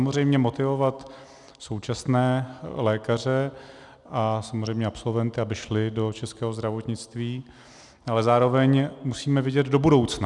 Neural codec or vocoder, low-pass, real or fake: none; 10.8 kHz; real